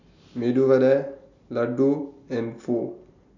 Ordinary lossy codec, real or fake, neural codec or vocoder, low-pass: none; real; none; 7.2 kHz